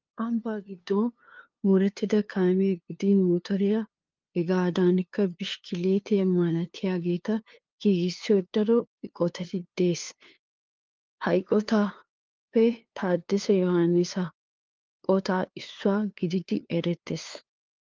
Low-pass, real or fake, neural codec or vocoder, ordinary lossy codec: 7.2 kHz; fake; codec, 16 kHz, 2 kbps, FunCodec, trained on LibriTTS, 25 frames a second; Opus, 32 kbps